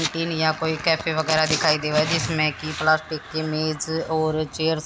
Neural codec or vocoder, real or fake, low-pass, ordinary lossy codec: none; real; none; none